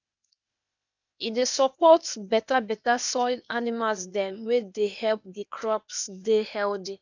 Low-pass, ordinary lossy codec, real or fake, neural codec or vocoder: 7.2 kHz; none; fake; codec, 16 kHz, 0.8 kbps, ZipCodec